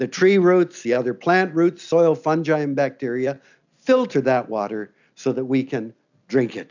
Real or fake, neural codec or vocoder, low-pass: real; none; 7.2 kHz